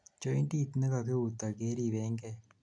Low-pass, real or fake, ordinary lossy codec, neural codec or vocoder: 9.9 kHz; real; none; none